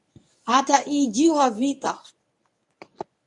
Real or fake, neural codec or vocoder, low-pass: fake; codec, 24 kHz, 0.9 kbps, WavTokenizer, medium speech release version 1; 10.8 kHz